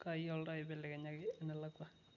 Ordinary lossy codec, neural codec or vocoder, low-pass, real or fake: none; none; 7.2 kHz; real